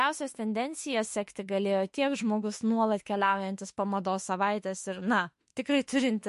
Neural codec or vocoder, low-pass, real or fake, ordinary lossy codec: autoencoder, 48 kHz, 32 numbers a frame, DAC-VAE, trained on Japanese speech; 14.4 kHz; fake; MP3, 48 kbps